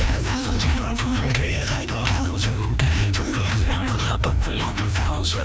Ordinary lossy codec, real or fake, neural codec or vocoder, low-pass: none; fake; codec, 16 kHz, 1 kbps, FreqCodec, larger model; none